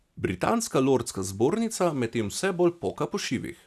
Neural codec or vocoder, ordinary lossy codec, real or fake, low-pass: none; none; real; 14.4 kHz